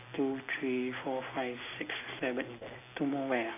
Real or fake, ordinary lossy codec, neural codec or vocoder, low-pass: real; AAC, 24 kbps; none; 3.6 kHz